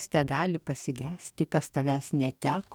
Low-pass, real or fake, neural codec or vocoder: 19.8 kHz; fake; codec, 44.1 kHz, 2.6 kbps, DAC